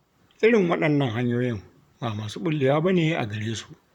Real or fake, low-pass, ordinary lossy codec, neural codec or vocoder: fake; 19.8 kHz; none; vocoder, 44.1 kHz, 128 mel bands, Pupu-Vocoder